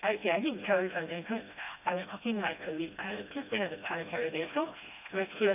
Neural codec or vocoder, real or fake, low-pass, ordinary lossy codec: codec, 16 kHz, 1 kbps, FreqCodec, smaller model; fake; 3.6 kHz; none